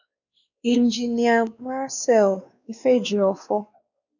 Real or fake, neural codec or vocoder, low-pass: fake; codec, 16 kHz, 2 kbps, X-Codec, WavLM features, trained on Multilingual LibriSpeech; 7.2 kHz